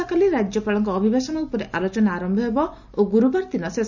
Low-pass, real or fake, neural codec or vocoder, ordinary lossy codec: 7.2 kHz; real; none; none